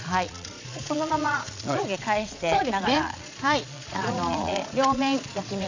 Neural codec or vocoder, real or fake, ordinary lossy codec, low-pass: vocoder, 22.05 kHz, 80 mel bands, Vocos; fake; AAC, 48 kbps; 7.2 kHz